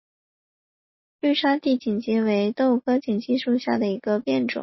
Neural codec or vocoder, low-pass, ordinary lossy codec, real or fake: none; 7.2 kHz; MP3, 24 kbps; real